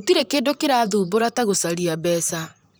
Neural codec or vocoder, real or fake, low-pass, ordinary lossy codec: vocoder, 44.1 kHz, 128 mel bands, Pupu-Vocoder; fake; none; none